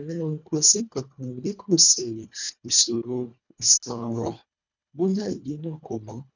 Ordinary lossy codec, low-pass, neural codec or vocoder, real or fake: none; 7.2 kHz; codec, 24 kHz, 1.5 kbps, HILCodec; fake